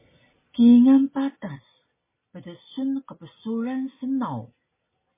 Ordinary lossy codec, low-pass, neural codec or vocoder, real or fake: MP3, 16 kbps; 3.6 kHz; none; real